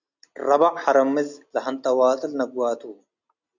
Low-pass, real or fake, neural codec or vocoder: 7.2 kHz; real; none